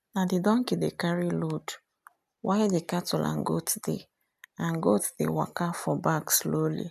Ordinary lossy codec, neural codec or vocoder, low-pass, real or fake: none; none; 14.4 kHz; real